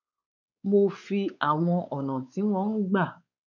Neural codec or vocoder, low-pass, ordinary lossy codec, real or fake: codec, 16 kHz, 4 kbps, X-Codec, WavLM features, trained on Multilingual LibriSpeech; 7.2 kHz; none; fake